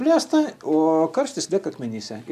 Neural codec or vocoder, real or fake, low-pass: none; real; 14.4 kHz